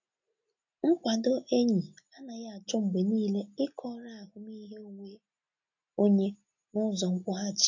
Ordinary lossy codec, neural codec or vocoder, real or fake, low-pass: none; none; real; 7.2 kHz